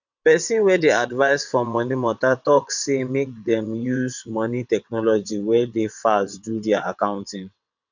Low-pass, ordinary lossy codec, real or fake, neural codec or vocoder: 7.2 kHz; none; fake; vocoder, 22.05 kHz, 80 mel bands, WaveNeXt